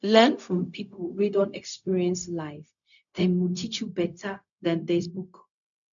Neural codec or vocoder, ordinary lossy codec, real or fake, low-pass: codec, 16 kHz, 0.4 kbps, LongCat-Audio-Codec; none; fake; 7.2 kHz